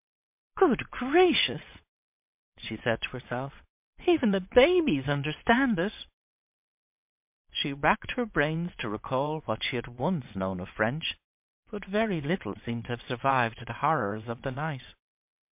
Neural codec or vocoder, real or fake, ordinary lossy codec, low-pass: vocoder, 22.05 kHz, 80 mel bands, WaveNeXt; fake; MP3, 32 kbps; 3.6 kHz